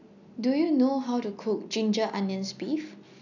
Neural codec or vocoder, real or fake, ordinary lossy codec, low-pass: none; real; none; 7.2 kHz